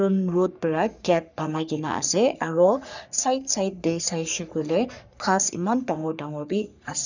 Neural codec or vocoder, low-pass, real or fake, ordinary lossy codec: codec, 44.1 kHz, 3.4 kbps, Pupu-Codec; 7.2 kHz; fake; none